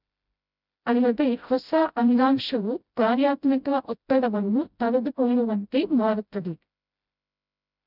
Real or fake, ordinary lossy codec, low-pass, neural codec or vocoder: fake; none; 5.4 kHz; codec, 16 kHz, 0.5 kbps, FreqCodec, smaller model